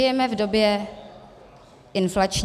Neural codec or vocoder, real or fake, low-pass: none; real; 14.4 kHz